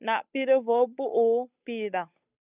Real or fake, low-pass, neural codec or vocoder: fake; 3.6 kHz; codec, 16 kHz, 8 kbps, FunCodec, trained on Chinese and English, 25 frames a second